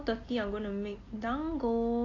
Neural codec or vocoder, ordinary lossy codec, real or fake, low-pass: none; AAC, 32 kbps; real; 7.2 kHz